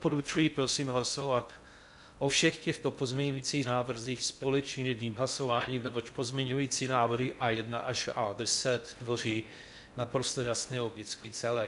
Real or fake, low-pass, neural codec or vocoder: fake; 10.8 kHz; codec, 16 kHz in and 24 kHz out, 0.6 kbps, FocalCodec, streaming, 2048 codes